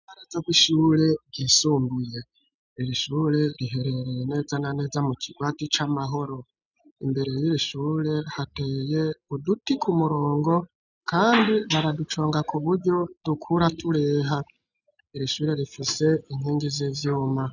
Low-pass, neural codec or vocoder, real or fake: 7.2 kHz; none; real